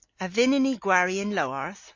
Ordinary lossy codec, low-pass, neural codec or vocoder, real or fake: AAC, 48 kbps; 7.2 kHz; none; real